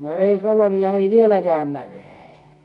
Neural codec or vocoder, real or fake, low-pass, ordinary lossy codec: codec, 24 kHz, 0.9 kbps, WavTokenizer, medium music audio release; fake; 10.8 kHz; none